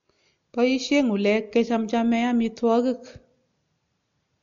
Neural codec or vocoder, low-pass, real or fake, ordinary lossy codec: none; 7.2 kHz; real; MP3, 48 kbps